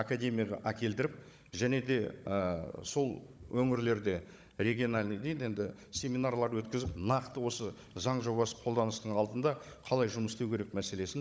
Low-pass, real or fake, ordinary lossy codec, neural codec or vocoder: none; fake; none; codec, 16 kHz, 16 kbps, FunCodec, trained on Chinese and English, 50 frames a second